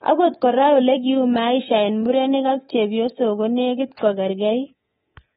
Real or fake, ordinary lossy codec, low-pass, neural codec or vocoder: fake; AAC, 16 kbps; 10.8 kHz; codec, 24 kHz, 3.1 kbps, DualCodec